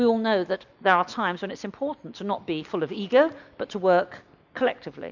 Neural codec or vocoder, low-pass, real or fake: none; 7.2 kHz; real